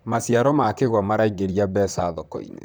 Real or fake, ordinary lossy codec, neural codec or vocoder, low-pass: fake; none; vocoder, 44.1 kHz, 128 mel bands, Pupu-Vocoder; none